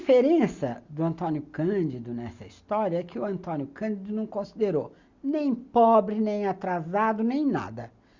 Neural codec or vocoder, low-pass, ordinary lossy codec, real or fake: none; 7.2 kHz; Opus, 64 kbps; real